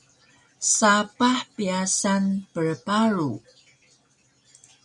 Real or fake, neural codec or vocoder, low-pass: real; none; 10.8 kHz